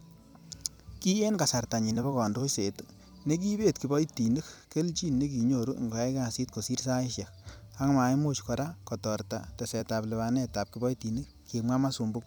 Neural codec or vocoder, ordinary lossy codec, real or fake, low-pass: vocoder, 44.1 kHz, 128 mel bands every 256 samples, BigVGAN v2; none; fake; none